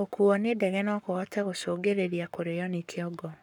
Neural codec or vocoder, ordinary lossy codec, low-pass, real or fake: codec, 44.1 kHz, 7.8 kbps, Pupu-Codec; none; 19.8 kHz; fake